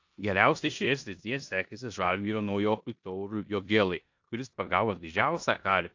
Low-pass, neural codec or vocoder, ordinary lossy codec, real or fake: 7.2 kHz; codec, 16 kHz in and 24 kHz out, 0.9 kbps, LongCat-Audio-Codec, four codebook decoder; AAC, 48 kbps; fake